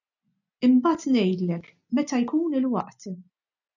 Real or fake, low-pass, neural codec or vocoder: real; 7.2 kHz; none